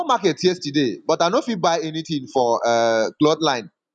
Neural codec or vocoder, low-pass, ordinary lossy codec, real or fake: none; 10.8 kHz; none; real